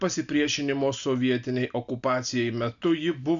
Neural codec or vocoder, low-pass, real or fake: none; 7.2 kHz; real